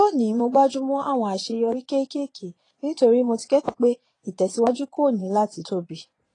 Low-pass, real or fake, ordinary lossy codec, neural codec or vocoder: 9.9 kHz; fake; AAC, 32 kbps; vocoder, 22.05 kHz, 80 mel bands, Vocos